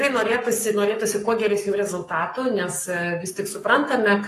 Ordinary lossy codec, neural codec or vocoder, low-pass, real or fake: AAC, 48 kbps; codec, 44.1 kHz, 7.8 kbps, Pupu-Codec; 14.4 kHz; fake